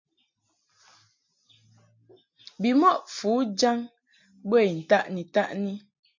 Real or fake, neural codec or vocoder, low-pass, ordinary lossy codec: real; none; 7.2 kHz; MP3, 48 kbps